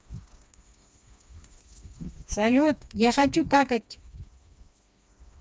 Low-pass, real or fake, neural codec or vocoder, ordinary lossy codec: none; fake; codec, 16 kHz, 2 kbps, FreqCodec, smaller model; none